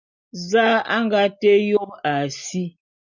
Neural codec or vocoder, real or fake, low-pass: none; real; 7.2 kHz